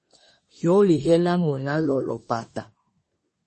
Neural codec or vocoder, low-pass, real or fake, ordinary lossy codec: codec, 24 kHz, 1 kbps, SNAC; 10.8 kHz; fake; MP3, 32 kbps